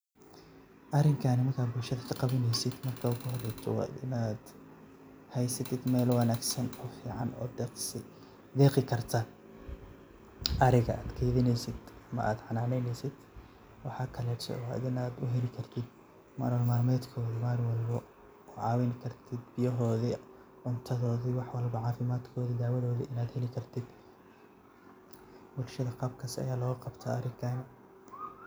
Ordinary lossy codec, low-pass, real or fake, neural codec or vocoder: none; none; real; none